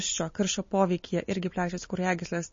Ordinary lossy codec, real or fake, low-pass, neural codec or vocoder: MP3, 32 kbps; real; 7.2 kHz; none